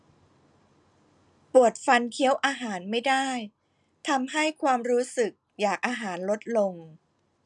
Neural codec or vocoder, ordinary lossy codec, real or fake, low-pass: none; none; real; 10.8 kHz